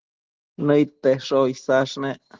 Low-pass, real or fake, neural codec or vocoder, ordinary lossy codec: 7.2 kHz; real; none; Opus, 16 kbps